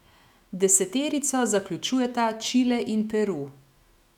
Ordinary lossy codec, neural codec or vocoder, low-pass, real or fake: none; autoencoder, 48 kHz, 128 numbers a frame, DAC-VAE, trained on Japanese speech; 19.8 kHz; fake